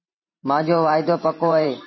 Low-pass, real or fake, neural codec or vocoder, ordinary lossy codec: 7.2 kHz; fake; vocoder, 44.1 kHz, 128 mel bands every 512 samples, BigVGAN v2; MP3, 24 kbps